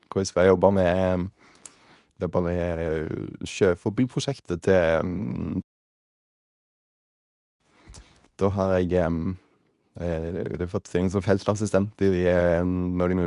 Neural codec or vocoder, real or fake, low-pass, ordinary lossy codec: codec, 24 kHz, 0.9 kbps, WavTokenizer, medium speech release version 2; fake; 10.8 kHz; none